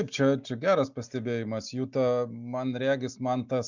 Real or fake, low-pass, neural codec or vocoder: real; 7.2 kHz; none